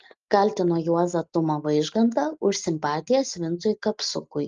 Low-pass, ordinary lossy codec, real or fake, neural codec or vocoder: 7.2 kHz; Opus, 32 kbps; real; none